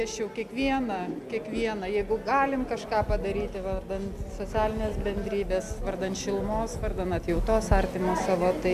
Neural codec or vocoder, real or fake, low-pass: none; real; 14.4 kHz